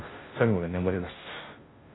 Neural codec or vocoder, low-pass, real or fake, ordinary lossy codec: codec, 16 kHz, 0.5 kbps, FunCodec, trained on Chinese and English, 25 frames a second; 7.2 kHz; fake; AAC, 16 kbps